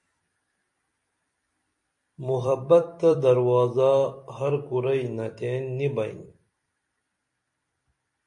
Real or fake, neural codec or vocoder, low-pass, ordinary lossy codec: fake; vocoder, 44.1 kHz, 128 mel bands every 256 samples, BigVGAN v2; 10.8 kHz; MP3, 48 kbps